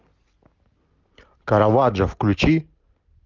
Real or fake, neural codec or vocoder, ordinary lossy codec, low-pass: real; none; Opus, 24 kbps; 7.2 kHz